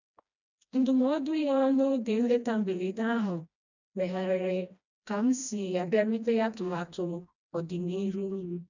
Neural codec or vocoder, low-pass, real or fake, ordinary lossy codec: codec, 16 kHz, 1 kbps, FreqCodec, smaller model; 7.2 kHz; fake; none